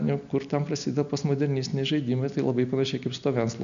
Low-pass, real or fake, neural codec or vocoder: 7.2 kHz; real; none